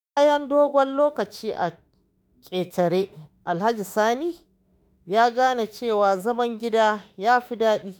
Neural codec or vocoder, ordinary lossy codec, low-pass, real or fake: autoencoder, 48 kHz, 32 numbers a frame, DAC-VAE, trained on Japanese speech; none; none; fake